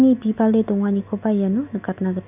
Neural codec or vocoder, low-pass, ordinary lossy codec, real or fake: none; 3.6 kHz; none; real